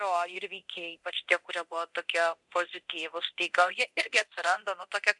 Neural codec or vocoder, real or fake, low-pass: codec, 24 kHz, 0.9 kbps, DualCodec; fake; 10.8 kHz